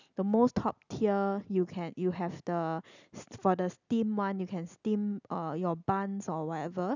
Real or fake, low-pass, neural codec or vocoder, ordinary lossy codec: real; 7.2 kHz; none; none